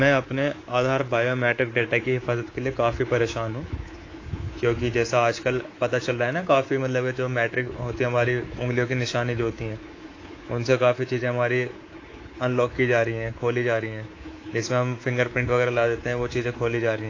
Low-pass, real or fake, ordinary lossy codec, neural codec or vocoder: 7.2 kHz; fake; AAC, 32 kbps; codec, 24 kHz, 3.1 kbps, DualCodec